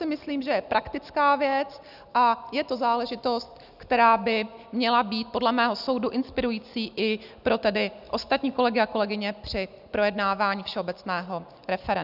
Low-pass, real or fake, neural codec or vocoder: 5.4 kHz; real; none